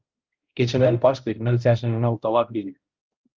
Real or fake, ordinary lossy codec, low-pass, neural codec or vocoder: fake; Opus, 24 kbps; 7.2 kHz; codec, 16 kHz, 0.5 kbps, X-Codec, HuBERT features, trained on balanced general audio